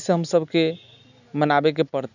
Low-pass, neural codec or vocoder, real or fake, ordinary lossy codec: 7.2 kHz; none; real; none